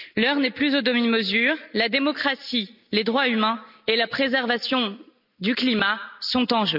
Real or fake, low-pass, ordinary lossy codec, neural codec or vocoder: real; 5.4 kHz; none; none